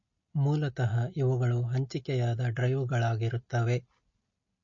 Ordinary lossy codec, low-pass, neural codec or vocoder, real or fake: MP3, 32 kbps; 7.2 kHz; none; real